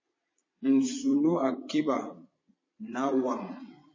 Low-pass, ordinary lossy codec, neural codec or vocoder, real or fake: 7.2 kHz; MP3, 32 kbps; vocoder, 22.05 kHz, 80 mel bands, Vocos; fake